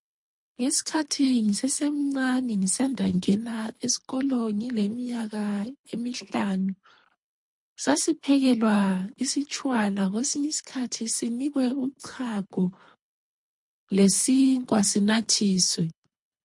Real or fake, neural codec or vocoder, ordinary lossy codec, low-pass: fake; codec, 24 kHz, 3 kbps, HILCodec; MP3, 48 kbps; 10.8 kHz